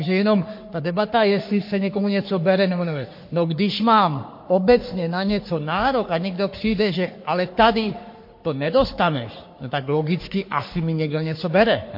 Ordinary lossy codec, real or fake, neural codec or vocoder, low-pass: MP3, 32 kbps; fake; codec, 44.1 kHz, 3.4 kbps, Pupu-Codec; 5.4 kHz